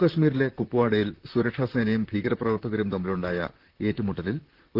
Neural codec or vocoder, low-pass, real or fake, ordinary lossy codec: vocoder, 44.1 kHz, 128 mel bands, Pupu-Vocoder; 5.4 kHz; fake; Opus, 16 kbps